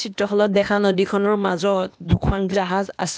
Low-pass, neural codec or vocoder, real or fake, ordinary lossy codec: none; codec, 16 kHz, 0.8 kbps, ZipCodec; fake; none